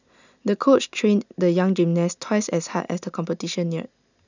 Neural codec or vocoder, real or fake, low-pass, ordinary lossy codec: none; real; 7.2 kHz; none